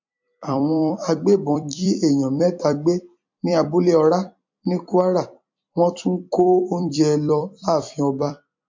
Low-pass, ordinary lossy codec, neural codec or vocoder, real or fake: 7.2 kHz; MP3, 48 kbps; vocoder, 44.1 kHz, 128 mel bands every 256 samples, BigVGAN v2; fake